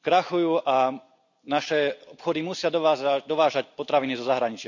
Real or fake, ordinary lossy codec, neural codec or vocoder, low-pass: real; none; none; 7.2 kHz